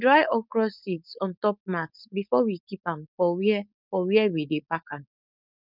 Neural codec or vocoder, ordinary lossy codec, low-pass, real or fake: codec, 16 kHz, 4.8 kbps, FACodec; none; 5.4 kHz; fake